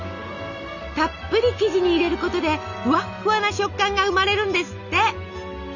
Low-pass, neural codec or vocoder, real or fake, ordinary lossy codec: 7.2 kHz; none; real; none